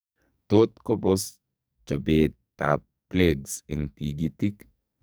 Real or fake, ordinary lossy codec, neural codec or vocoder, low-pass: fake; none; codec, 44.1 kHz, 2.6 kbps, SNAC; none